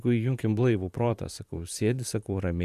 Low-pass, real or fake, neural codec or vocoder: 14.4 kHz; fake; vocoder, 44.1 kHz, 128 mel bands, Pupu-Vocoder